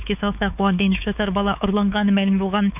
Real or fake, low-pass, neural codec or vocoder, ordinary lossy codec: fake; 3.6 kHz; codec, 16 kHz, 4 kbps, X-Codec, WavLM features, trained on Multilingual LibriSpeech; none